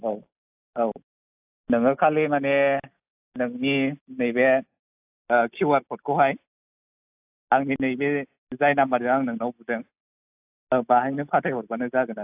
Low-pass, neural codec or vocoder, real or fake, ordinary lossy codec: 3.6 kHz; none; real; AAC, 32 kbps